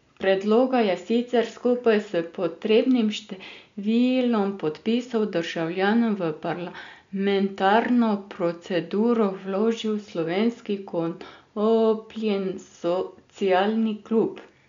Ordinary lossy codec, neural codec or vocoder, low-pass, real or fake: MP3, 64 kbps; none; 7.2 kHz; real